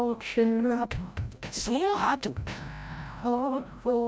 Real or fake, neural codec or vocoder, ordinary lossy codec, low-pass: fake; codec, 16 kHz, 0.5 kbps, FreqCodec, larger model; none; none